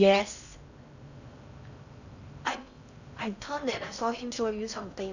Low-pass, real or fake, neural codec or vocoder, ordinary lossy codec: 7.2 kHz; fake; codec, 16 kHz in and 24 kHz out, 0.6 kbps, FocalCodec, streaming, 4096 codes; none